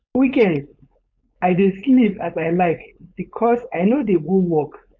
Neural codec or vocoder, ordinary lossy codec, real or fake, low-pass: codec, 16 kHz, 4.8 kbps, FACodec; none; fake; 7.2 kHz